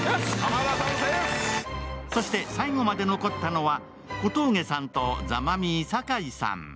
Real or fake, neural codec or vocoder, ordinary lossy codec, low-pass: real; none; none; none